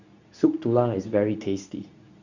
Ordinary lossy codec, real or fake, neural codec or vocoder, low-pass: none; fake; codec, 24 kHz, 0.9 kbps, WavTokenizer, medium speech release version 2; 7.2 kHz